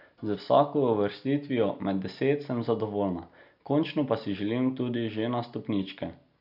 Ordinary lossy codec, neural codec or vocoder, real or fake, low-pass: none; none; real; 5.4 kHz